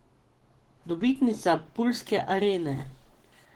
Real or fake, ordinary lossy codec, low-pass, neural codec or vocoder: fake; Opus, 16 kbps; 19.8 kHz; codec, 44.1 kHz, 7.8 kbps, Pupu-Codec